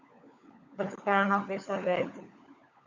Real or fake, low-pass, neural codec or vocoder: fake; 7.2 kHz; codec, 16 kHz, 16 kbps, FunCodec, trained on LibriTTS, 50 frames a second